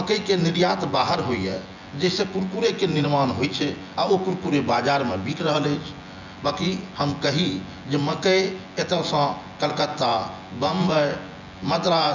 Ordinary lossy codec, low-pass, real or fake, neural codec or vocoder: none; 7.2 kHz; fake; vocoder, 24 kHz, 100 mel bands, Vocos